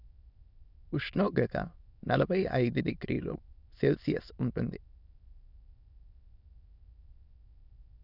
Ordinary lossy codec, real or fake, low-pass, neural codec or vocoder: none; fake; 5.4 kHz; autoencoder, 22.05 kHz, a latent of 192 numbers a frame, VITS, trained on many speakers